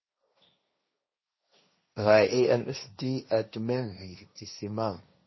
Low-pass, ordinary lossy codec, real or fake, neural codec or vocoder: 7.2 kHz; MP3, 24 kbps; fake; codec, 16 kHz, 1.1 kbps, Voila-Tokenizer